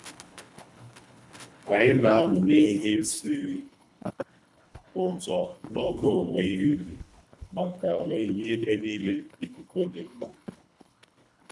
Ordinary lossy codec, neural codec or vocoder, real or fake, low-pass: none; codec, 24 kHz, 1.5 kbps, HILCodec; fake; none